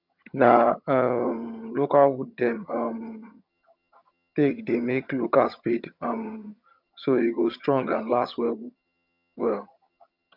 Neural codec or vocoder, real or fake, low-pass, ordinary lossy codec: vocoder, 22.05 kHz, 80 mel bands, HiFi-GAN; fake; 5.4 kHz; none